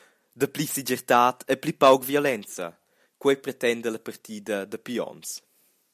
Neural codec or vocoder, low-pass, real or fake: none; 14.4 kHz; real